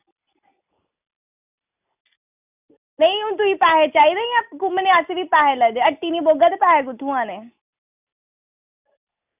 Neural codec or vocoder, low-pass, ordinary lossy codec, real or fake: none; 3.6 kHz; none; real